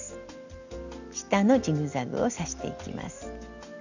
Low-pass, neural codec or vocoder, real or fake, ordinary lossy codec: 7.2 kHz; none; real; none